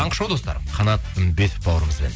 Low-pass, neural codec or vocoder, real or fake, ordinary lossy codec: none; none; real; none